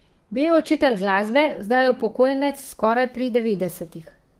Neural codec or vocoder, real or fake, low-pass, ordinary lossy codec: codec, 32 kHz, 1.9 kbps, SNAC; fake; 14.4 kHz; Opus, 24 kbps